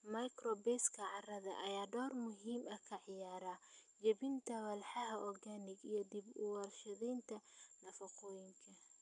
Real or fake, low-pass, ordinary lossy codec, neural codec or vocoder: real; 10.8 kHz; none; none